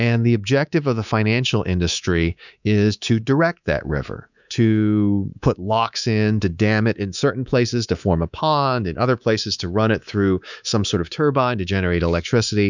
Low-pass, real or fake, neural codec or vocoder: 7.2 kHz; fake; codec, 24 kHz, 3.1 kbps, DualCodec